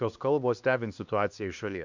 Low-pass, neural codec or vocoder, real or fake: 7.2 kHz; codec, 16 kHz, 2 kbps, X-Codec, HuBERT features, trained on LibriSpeech; fake